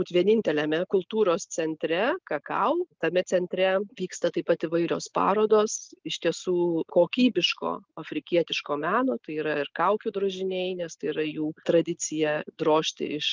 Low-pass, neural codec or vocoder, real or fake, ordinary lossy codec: 7.2 kHz; none; real; Opus, 32 kbps